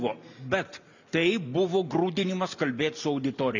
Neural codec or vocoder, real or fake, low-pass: none; real; 7.2 kHz